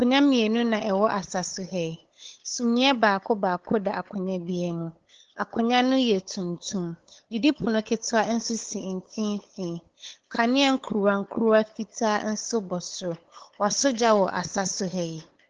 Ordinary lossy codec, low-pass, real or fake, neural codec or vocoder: Opus, 16 kbps; 7.2 kHz; fake; codec, 16 kHz, 8 kbps, FunCodec, trained on LibriTTS, 25 frames a second